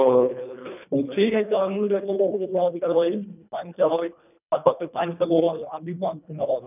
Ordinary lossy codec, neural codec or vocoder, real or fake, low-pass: none; codec, 24 kHz, 1.5 kbps, HILCodec; fake; 3.6 kHz